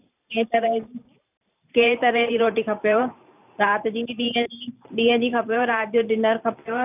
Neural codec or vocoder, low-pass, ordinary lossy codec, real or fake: vocoder, 44.1 kHz, 128 mel bands every 512 samples, BigVGAN v2; 3.6 kHz; none; fake